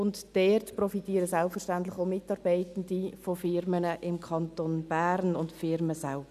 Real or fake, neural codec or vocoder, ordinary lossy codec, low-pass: real; none; none; 14.4 kHz